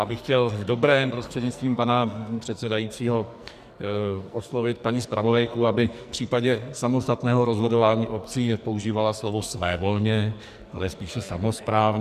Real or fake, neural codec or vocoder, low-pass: fake; codec, 44.1 kHz, 2.6 kbps, SNAC; 14.4 kHz